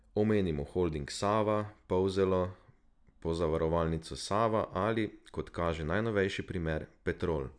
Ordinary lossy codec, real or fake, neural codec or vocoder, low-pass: none; real; none; 9.9 kHz